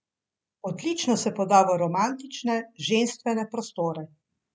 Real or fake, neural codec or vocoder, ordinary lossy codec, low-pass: real; none; none; none